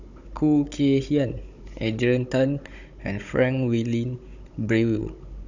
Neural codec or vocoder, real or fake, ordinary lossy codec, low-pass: codec, 16 kHz, 16 kbps, FunCodec, trained on Chinese and English, 50 frames a second; fake; none; 7.2 kHz